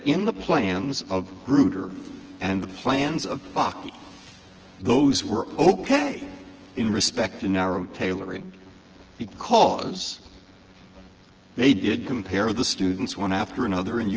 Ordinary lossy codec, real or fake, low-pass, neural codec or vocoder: Opus, 16 kbps; fake; 7.2 kHz; vocoder, 24 kHz, 100 mel bands, Vocos